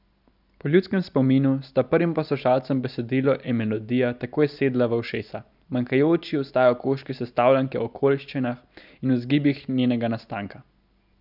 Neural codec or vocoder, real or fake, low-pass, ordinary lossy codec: none; real; 5.4 kHz; none